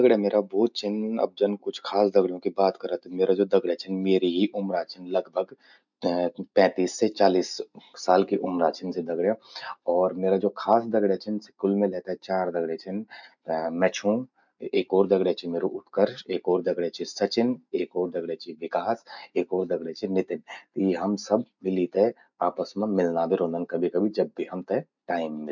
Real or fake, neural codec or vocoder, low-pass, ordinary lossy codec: real; none; 7.2 kHz; none